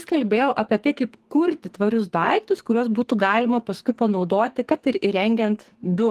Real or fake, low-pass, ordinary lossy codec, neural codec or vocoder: fake; 14.4 kHz; Opus, 24 kbps; codec, 44.1 kHz, 2.6 kbps, SNAC